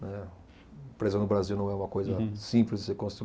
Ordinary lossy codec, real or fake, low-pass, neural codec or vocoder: none; real; none; none